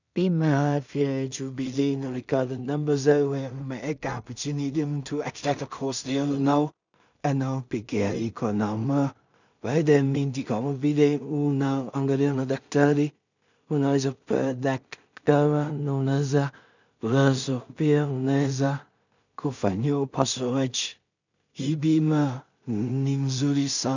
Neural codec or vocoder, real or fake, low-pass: codec, 16 kHz in and 24 kHz out, 0.4 kbps, LongCat-Audio-Codec, two codebook decoder; fake; 7.2 kHz